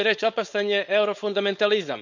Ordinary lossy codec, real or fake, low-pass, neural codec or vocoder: none; fake; 7.2 kHz; codec, 16 kHz, 4.8 kbps, FACodec